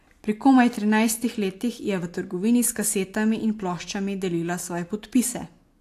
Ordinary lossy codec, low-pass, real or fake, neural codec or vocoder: AAC, 64 kbps; 14.4 kHz; real; none